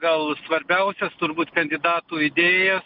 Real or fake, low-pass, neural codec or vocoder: real; 5.4 kHz; none